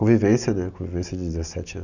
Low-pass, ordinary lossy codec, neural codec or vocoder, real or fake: 7.2 kHz; none; none; real